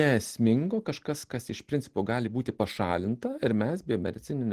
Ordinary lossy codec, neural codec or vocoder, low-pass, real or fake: Opus, 16 kbps; none; 14.4 kHz; real